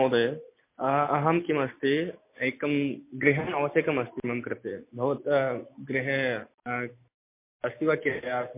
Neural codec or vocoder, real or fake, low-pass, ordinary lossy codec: none; real; 3.6 kHz; MP3, 24 kbps